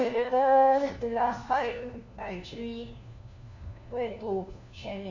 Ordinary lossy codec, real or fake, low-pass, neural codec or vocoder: none; fake; 7.2 kHz; codec, 16 kHz, 1 kbps, FunCodec, trained on LibriTTS, 50 frames a second